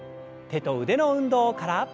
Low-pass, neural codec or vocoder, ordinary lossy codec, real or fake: none; none; none; real